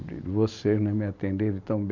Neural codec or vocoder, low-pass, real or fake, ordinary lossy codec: vocoder, 44.1 kHz, 128 mel bands every 256 samples, BigVGAN v2; 7.2 kHz; fake; none